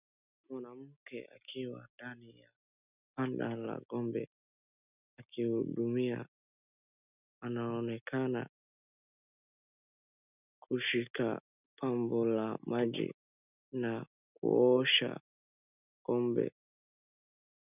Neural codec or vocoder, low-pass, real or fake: none; 3.6 kHz; real